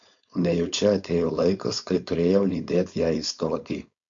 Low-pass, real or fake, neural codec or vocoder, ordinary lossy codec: 7.2 kHz; fake; codec, 16 kHz, 4.8 kbps, FACodec; AAC, 64 kbps